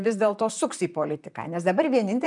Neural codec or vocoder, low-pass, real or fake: vocoder, 44.1 kHz, 128 mel bands, Pupu-Vocoder; 10.8 kHz; fake